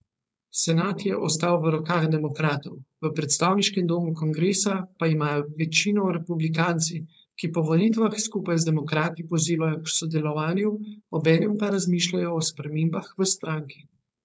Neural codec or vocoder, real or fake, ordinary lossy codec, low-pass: codec, 16 kHz, 4.8 kbps, FACodec; fake; none; none